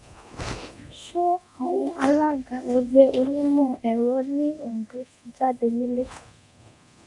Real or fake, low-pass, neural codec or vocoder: fake; 10.8 kHz; codec, 24 kHz, 0.9 kbps, DualCodec